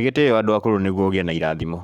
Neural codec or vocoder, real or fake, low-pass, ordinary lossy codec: codec, 44.1 kHz, 7.8 kbps, Pupu-Codec; fake; 19.8 kHz; none